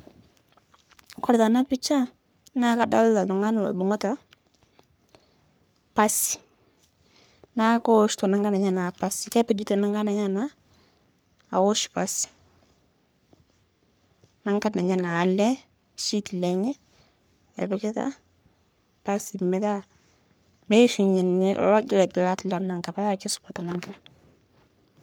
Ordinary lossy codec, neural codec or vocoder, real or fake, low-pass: none; codec, 44.1 kHz, 3.4 kbps, Pupu-Codec; fake; none